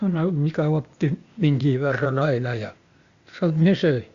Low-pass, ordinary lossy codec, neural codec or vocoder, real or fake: 7.2 kHz; Opus, 64 kbps; codec, 16 kHz, 0.8 kbps, ZipCodec; fake